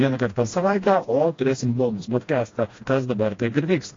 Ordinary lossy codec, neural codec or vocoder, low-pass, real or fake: AAC, 32 kbps; codec, 16 kHz, 1 kbps, FreqCodec, smaller model; 7.2 kHz; fake